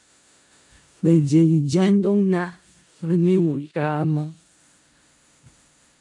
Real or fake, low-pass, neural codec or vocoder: fake; 10.8 kHz; codec, 16 kHz in and 24 kHz out, 0.4 kbps, LongCat-Audio-Codec, four codebook decoder